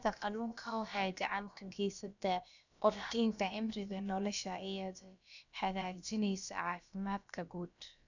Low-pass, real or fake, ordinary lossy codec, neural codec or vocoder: 7.2 kHz; fake; none; codec, 16 kHz, about 1 kbps, DyCAST, with the encoder's durations